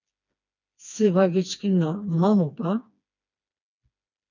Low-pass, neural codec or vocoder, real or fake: 7.2 kHz; codec, 16 kHz, 2 kbps, FreqCodec, smaller model; fake